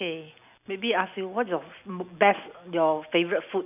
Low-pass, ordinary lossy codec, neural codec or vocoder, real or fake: 3.6 kHz; none; none; real